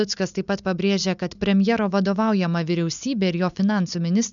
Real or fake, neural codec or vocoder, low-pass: real; none; 7.2 kHz